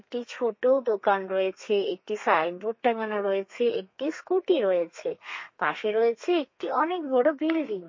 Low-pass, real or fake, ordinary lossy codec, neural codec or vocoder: 7.2 kHz; fake; MP3, 32 kbps; codec, 32 kHz, 1.9 kbps, SNAC